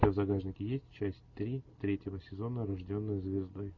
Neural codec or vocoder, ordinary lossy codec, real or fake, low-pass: none; MP3, 64 kbps; real; 7.2 kHz